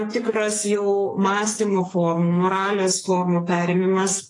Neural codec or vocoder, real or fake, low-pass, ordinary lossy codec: codec, 44.1 kHz, 2.6 kbps, SNAC; fake; 10.8 kHz; AAC, 32 kbps